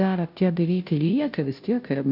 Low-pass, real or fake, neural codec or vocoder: 5.4 kHz; fake; codec, 16 kHz, 0.5 kbps, FunCodec, trained on Chinese and English, 25 frames a second